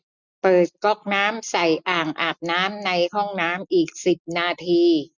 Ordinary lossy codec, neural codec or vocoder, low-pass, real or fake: none; none; 7.2 kHz; real